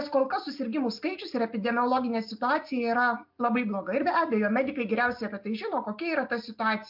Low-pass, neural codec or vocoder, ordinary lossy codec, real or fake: 5.4 kHz; none; MP3, 48 kbps; real